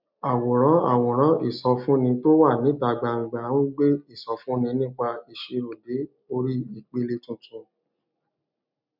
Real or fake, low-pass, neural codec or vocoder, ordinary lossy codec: real; 5.4 kHz; none; none